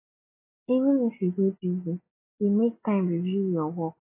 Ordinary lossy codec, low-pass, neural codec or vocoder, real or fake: AAC, 32 kbps; 3.6 kHz; none; real